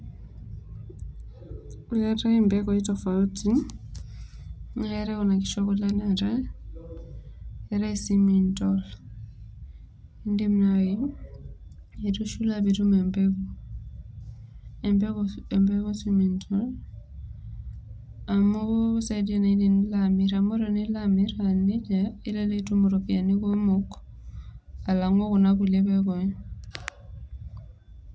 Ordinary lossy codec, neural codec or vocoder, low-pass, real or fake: none; none; none; real